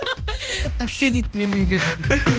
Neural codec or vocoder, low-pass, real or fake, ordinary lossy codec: codec, 16 kHz, 1 kbps, X-Codec, HuBERT features, trained on balanced general audio; none; fake; none